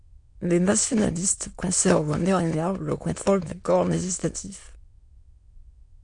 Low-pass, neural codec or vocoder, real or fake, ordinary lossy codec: 9.9 kHz; autoencoder, 22.05 kHz, a latent of 192 numbers a frame, VITS, trained on many speakers; fake; MP3, 64 kbps